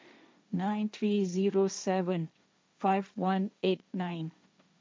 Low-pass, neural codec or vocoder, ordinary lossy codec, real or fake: none; codec, 16 kHz, 1.1 kbps, Voila-Tokenizer; none; fake